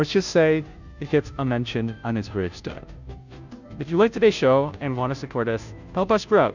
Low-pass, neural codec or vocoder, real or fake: 7.2 kHz; codec, 16 kHz, 0.5 kbps, FunCodec, trained on Chinese and English, 25 frames a second; fake